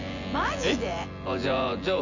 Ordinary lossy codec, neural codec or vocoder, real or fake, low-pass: none; vocoder, 24 kHz, 100 mel bands, Vocos; fake; 7.2 kHz